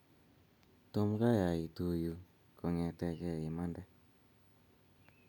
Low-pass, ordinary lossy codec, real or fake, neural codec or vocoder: none; none; real; none